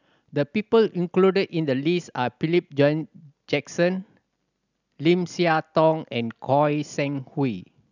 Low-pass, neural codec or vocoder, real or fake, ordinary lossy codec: 7.2 kHz; none; real; none